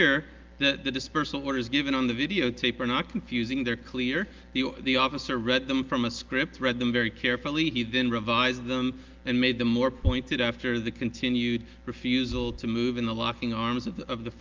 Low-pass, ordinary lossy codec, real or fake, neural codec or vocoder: 7.2 kHz; Opus, 32 kbps; real; none